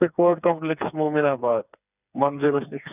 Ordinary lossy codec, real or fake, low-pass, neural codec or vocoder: none; fake; 3.6 kHz; codec, 16 kHz, 4 kbps, FreqCodec, smaller model